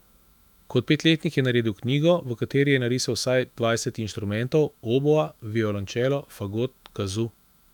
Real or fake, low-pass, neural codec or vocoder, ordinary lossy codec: fake; 19.8 kHz; autoencoder, 48 kHz, 128 numbers a frame, DAC-VAE, trained on Japanese speech; none